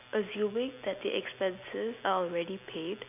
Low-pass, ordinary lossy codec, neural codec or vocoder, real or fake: 3.6 kHz; none; none; real